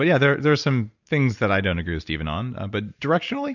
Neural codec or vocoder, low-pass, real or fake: none; 7.2 kHz; real